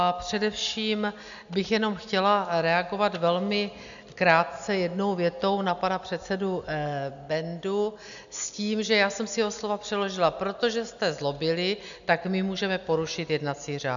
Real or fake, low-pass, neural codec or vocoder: real; 7.2 kHz; none